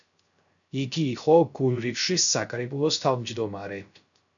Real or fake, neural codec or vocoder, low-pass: fake; codec, 16 kHz, 0.3 kbps, FocalCodec; 7.2 kHz